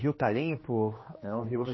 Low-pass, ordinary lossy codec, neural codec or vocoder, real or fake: 7.2 kHz; MP3, 24 kbps; codec, 16 kHz, 2 kbps, X-Codec, HuBERT features, trained on general audio; fake